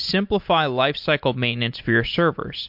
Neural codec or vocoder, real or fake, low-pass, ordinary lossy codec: none; real; 5.4 kHz; MP3, 48 kbps